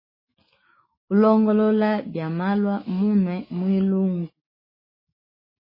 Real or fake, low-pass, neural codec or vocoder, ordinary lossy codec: fake; 5.4 kHz; autoencoder, 48 kHz, 128 numbers a frame, DAC-VAE, trained on Japanese speech; MP3, 24 kbps